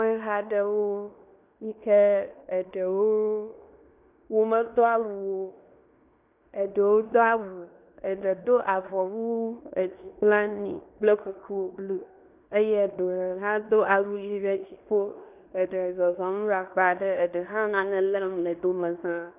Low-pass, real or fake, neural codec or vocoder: 3.6 kHz; fake; codec, 16 kHz in and 24 kHz out, 0.9 kbps, LongCat-Audio-Codec, fine tuned four codebook decoder